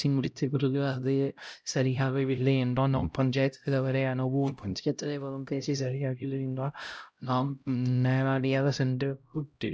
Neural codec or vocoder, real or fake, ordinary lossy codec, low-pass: codec, 16 kHz, 0.5 kbps, X-Codec, HuBERT features, trained on LibriSpeech; fake; none; none